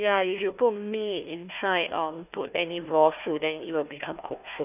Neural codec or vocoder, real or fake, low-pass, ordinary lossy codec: codec, 16 kHz, 1 kbps, FunCodec, trained on Chinese and English, 50 frames a second; fake; 3.6 kHz; none